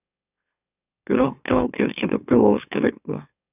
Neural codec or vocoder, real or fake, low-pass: autoencoder, 44.1 kHz, a latent of 192 numbers a frame, MeloTTS; fake; 3.6 kHz